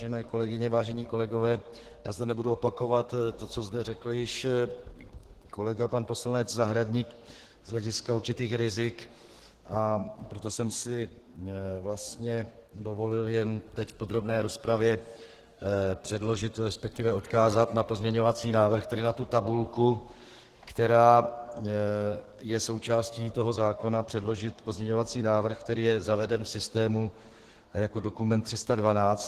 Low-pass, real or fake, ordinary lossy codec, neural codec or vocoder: 14.4 kHz; fake; Opus, 16 kbps; codec, 32 kHz, 1.9 kbps, SNAC